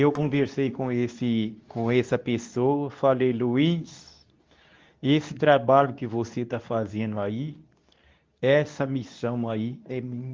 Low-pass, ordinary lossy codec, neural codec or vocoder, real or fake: 7.2 kHz; Opus, 24 kbps; codec, 24 kHz, 0.9 kbps, WavTokenizer, medium speech release version 2; fake